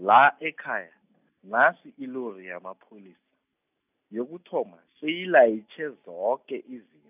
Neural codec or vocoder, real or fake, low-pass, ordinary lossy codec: none; real; 3.6 kHz; none